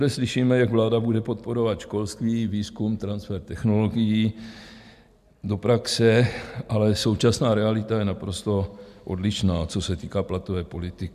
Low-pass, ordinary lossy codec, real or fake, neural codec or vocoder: 14.4 kHz; MP3, 96 kbps; real; none